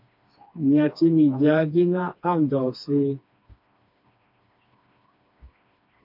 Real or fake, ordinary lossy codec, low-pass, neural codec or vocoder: fake; MP3, 48 kbps; 5.4 kHz; codec, 16 kHz, 2 kbps, FreqCodec, smaller model